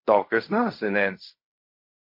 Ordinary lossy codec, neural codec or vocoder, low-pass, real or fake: MP3, 32 kbps; codec, 16 kHz in and 24 kHz out, 0.4 kbps, LongCat-Audio-Codec, fine tuned four codebook decoder; 5.4 kHz; fake